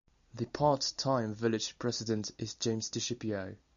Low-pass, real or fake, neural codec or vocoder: 7.2 kHz; real; none